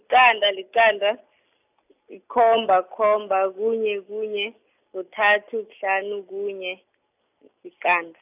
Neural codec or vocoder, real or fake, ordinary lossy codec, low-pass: none; real; none; 3.6 kHz